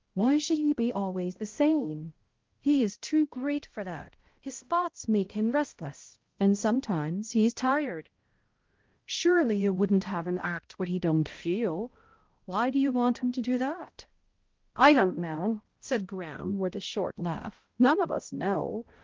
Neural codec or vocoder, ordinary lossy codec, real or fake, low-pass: codec, 16 kHz, 0.5 kbps, X-Codec, HuBERT features, trained on balanced general audio; Opus, 16 kbps; fake; 7.2 kHz